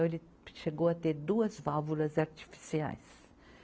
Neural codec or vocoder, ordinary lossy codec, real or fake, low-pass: none; none; real; none